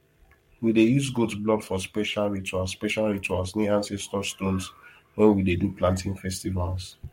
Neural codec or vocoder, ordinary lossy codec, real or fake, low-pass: codec, 44.1 kHz, 7.8 kbps, Pupu-Codec; MP3, 64 kbps; fake; 19.8 kHz